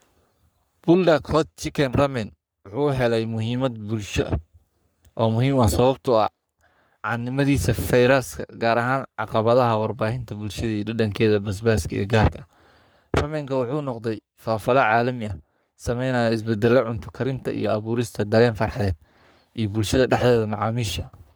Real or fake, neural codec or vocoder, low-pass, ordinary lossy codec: fake; codec, 44.1 kHz, 3.4 kbps, Pupu-Codec; none; none